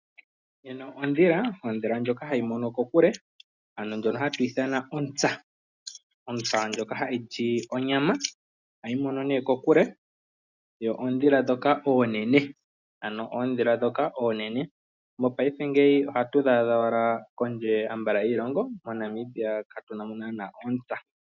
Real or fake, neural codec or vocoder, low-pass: real; none; 7.2 kHz